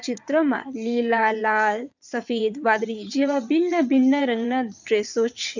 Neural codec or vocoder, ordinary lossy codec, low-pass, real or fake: vocoder, 22.05 kHz, 80 mel bands, WaveNeXt; none; 7.2 kHz; fake